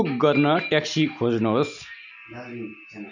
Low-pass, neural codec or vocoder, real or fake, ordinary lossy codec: 7.2 kHz; none; real; none